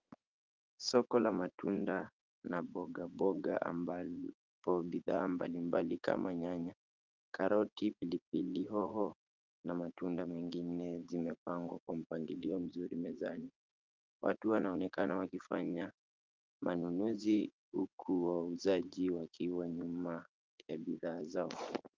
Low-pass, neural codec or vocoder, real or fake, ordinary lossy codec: 7.2 kHz; vocoder, 44.1 kHz, 80 mel bands, Vocos; fake; Opus, 32 kbps